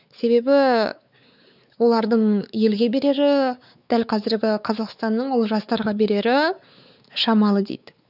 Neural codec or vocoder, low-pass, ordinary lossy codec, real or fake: codec, 16 kHz, 4 kbps, X-Codec, WavLM features, trained on Multilingual LibriSpeech; 5.4 kHz; none; fake